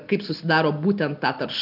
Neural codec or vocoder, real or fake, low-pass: none; real; 5.4 kHz